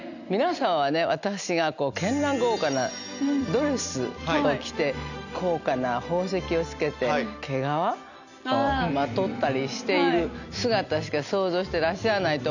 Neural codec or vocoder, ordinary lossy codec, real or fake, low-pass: none; none; real; 7.2 kHz